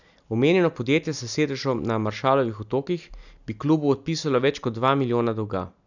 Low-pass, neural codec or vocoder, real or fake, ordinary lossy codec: 7.2 kHz; none; real; none